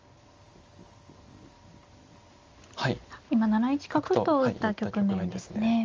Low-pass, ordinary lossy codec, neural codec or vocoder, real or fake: 7.2 kHz; Opus, 32 kbps; none; real